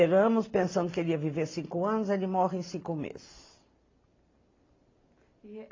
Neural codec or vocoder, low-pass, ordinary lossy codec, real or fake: none; 7.2 kHz; AAC, 32 kbps; real